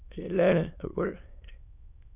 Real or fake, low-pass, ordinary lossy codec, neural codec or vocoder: fake; 3.6 kHz; none; autoencoder, 22.05 kHz, a latent of 192 numbers a frame, VITS, trained on many speakers